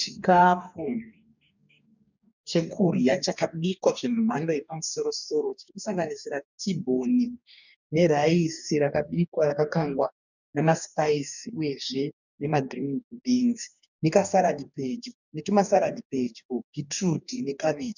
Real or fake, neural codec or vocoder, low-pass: fake; codec, 44.1 kHz, 2.6 kbps, DAC; 7.2 kHz